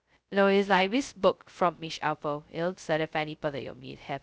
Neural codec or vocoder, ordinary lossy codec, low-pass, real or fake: codec, 16 kHz, 0.2 kbps, FocalCodec; none; none; fake